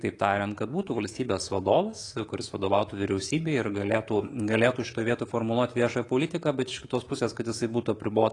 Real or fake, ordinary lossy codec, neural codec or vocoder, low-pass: fake; AAC, 32 kbps; codec, 24 kHz, 3.1 kbps, DualCodec; 10.8 kHz